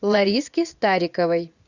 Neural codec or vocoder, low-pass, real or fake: vocoder, 24 kHz, 100 mel bands, Vocos; 7.2 kHz; fake